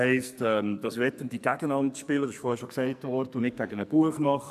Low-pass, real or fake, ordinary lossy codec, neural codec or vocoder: 14.4 kHz; fake; none; codec, 44.1 kHz, 2.6 kbps, SNAC